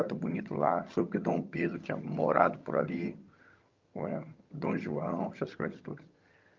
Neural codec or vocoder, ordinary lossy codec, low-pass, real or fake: vocoder, 22.05 kHz, 80 mel bands, HiFi-GAN; Opus, 32 kbps; 7.2 kHz; fake